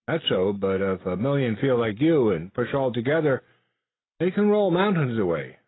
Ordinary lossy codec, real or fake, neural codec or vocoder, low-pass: AAC, 16 kbps; real; none; 7.2 kHz